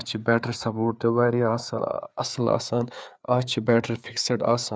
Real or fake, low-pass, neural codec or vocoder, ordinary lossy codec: fake; none; codec, 16 kHz, 4 kbps, FreqCodec, larger model; none